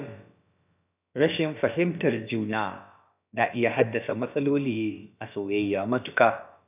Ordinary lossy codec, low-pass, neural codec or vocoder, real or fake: none; 3.6 kHz; codec, 16 kHz, about 1 kbps, DyCAST, with the encoder's durations; fake